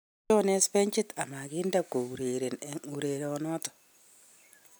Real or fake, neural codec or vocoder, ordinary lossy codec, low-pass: real; none; none; none